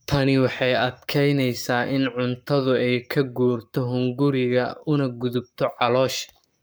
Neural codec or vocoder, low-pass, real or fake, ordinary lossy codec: codec, 44.1 kHz, 7.8 kbps, Pupu-Codec; none; fake; none